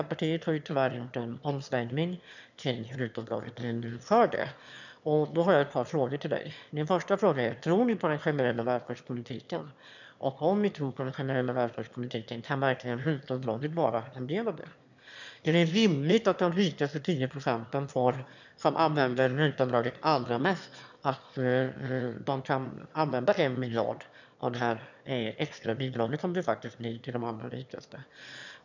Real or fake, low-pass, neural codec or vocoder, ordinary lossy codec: fake; 7.2 kHz; autoencoder, 22.05 kHz, a latent of 192 numbers a frame, VITS, trained on one speaker; none